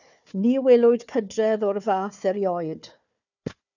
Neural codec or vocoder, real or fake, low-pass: codec, 16 kHz, 4 kbps, FunCodec, trained on Chinese and English, 50 frames a second; fake; 7.2 kHz